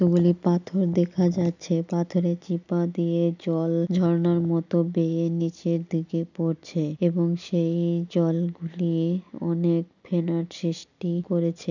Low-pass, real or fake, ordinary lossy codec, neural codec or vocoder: 7.2 kHz; real; none; none